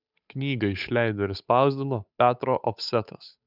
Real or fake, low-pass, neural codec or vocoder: fake; 5.4 kHz; codec, 16 kHz, 8 kbps, FunCodec, trained on Chinese and English, 25 frames a second